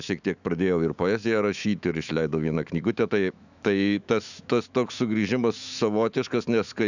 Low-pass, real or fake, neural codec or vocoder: 7.2 kHz; fake; autoencoder, 48 kHz, 128 numbers a frame, DAC-VAE, trained on Japanese speech